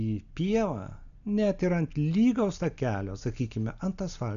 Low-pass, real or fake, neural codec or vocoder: 7.2 kHz; real; none